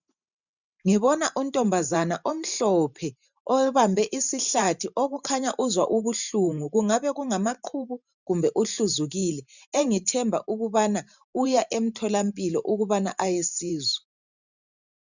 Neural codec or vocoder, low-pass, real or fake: vocoder, 44.1 kHz, 128 mel bands every 512 samples, BigVGAN v2; 7.2 kHz; fake